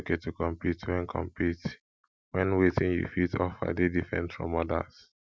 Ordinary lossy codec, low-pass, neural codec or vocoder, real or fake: none; none; none; real